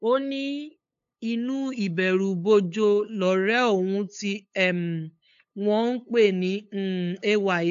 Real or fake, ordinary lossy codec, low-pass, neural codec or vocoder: fake; AAC, 64 kbps; 7.2 kHz; codec, 16 kHz, 8 kbps, FunCodec, trained on LibriTTS, 25 frames a second